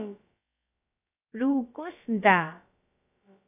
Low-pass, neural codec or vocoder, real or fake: 3.6 kHz; codec, 16 kHz, about 1 kbps, DyCAST, with the encoder's durations; fake